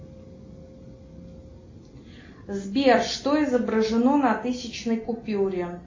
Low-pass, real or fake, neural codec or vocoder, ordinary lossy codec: 7.2 kHz; real; none; MP3, 32 kbps